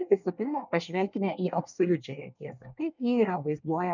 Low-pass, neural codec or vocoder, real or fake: 7.2 kHz; codec, 24 kHz, 1 kbps, SNAC; fake